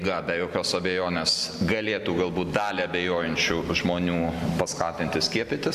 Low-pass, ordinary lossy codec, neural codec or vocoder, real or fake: 14.4 kHz; Opus, 64 kbps; none; real